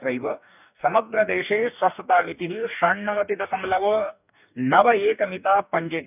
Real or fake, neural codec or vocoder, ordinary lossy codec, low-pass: fake; codec, 44.1 kHz, 2.6 kbps, DAC; none; 3.6 kHz